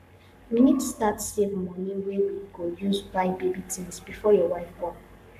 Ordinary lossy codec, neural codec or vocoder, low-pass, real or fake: none; codec, 44.1 kHz, 7.8 kbps, Pupu-Codec; 14.4 kHz; fake